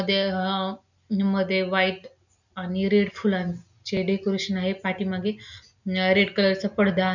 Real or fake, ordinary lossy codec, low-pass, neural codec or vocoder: real; none; 7.2 kHz; none